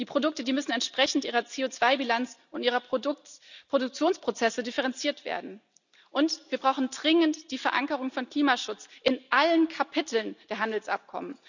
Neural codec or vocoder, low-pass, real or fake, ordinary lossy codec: none; 7.2 kHz; real; none